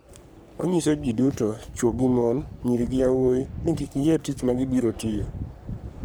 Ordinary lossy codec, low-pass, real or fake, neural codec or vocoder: none; none; fake; codec, 44.1 kHz, 3.4 kbps, Pupu-Codec